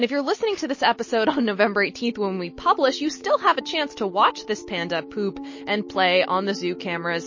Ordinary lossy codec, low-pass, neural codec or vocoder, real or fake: MP3, 32 kbps; 7.2 kHz; none; real